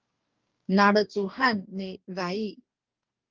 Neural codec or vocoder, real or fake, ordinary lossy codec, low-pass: codec, 44.1 kHz, 2.6 kbps, DAC; fake; Opus, 32 kbps; 7.2 kHz